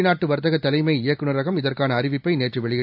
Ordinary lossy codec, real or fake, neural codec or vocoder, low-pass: AAC, 48 kbps; real; none; 5.4 kHz